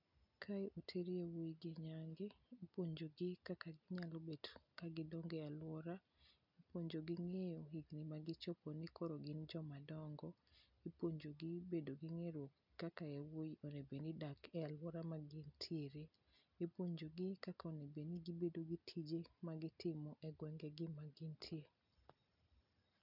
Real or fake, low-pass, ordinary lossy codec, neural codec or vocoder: real; 5.4 kHz; none; none